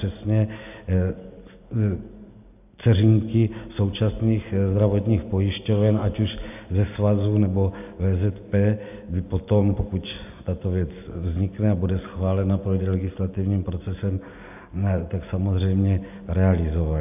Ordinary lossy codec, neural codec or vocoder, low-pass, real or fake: AAC, 32 kbps; none; 3.6 kHz; real